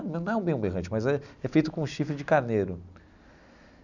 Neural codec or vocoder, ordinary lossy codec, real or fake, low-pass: none; none; real; 7.2 kHz